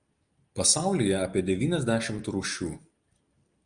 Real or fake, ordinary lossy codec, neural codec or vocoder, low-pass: real; Opus, 32 kbps; none; 9.9 kHz